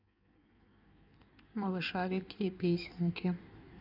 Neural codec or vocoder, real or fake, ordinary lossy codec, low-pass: codec, 16 kHz in and 24 kHz out, 1.1 kbps, FireRedTTS-2 codec; fake; none; 5.4 kHz